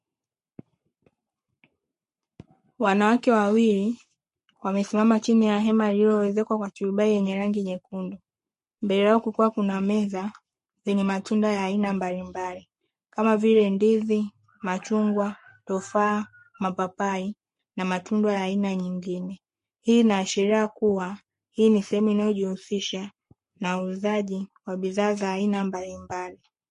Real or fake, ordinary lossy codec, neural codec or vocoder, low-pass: fake; MP3, 48 kbps; codec, 44.1 kHz, 7.8 kbps, Pupu-Codec; 14.4 kHz